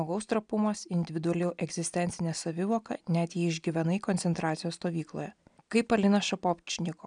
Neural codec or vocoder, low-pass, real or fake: none; 9.9 kHz; real